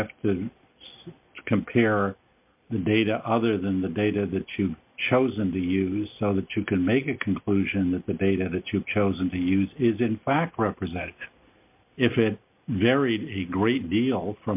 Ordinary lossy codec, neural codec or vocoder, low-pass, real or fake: MP3, 32 kbps; none; 3.6 kHz; real